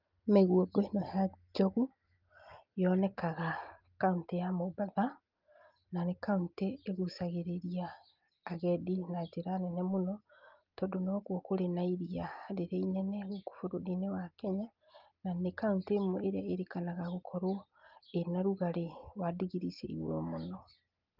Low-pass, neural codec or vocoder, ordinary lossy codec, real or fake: 5.4 kHz; none; Opus, 32 kbps; real